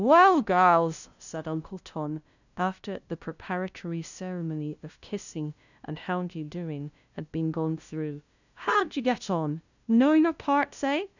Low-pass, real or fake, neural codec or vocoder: 7.2 kHz; fake; codec, 16 kHz, 0.5 kbps, FunCodec, trained on LibriTTS, 25 frames a second